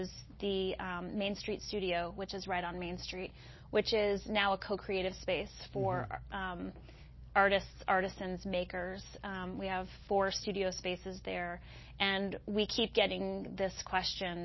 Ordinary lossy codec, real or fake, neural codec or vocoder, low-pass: MP3, 24 kbps; real; none; 7.2 kHz